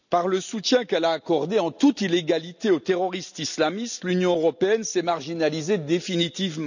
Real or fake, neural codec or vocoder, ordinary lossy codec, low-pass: real; none; none; 7.2 kHz